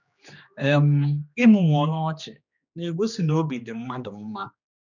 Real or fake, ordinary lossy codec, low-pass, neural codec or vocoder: fake; none; 7.2 kHz; codec, 16 kHz, 2 kbps, X-Codec, HuBERT features, trained on general audio